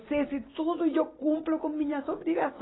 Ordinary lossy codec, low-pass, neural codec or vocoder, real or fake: AAC, 16 kbps; 7.2 kHz; none; real